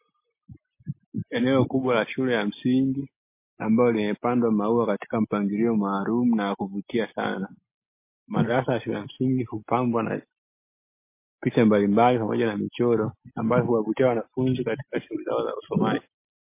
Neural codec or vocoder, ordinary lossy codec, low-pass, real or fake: none; MP3, 24 kbps; 3.6 kHz; real